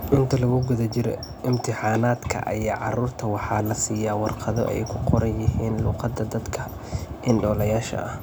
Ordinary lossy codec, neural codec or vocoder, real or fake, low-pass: none; none; real; none